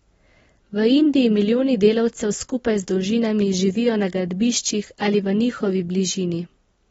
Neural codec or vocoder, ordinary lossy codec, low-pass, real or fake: none; AAC, 24 kbps; 10.8 kHz; real